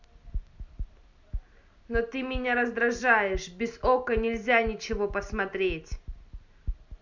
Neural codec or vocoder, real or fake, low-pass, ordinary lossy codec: none; real; 7.2 kHz; none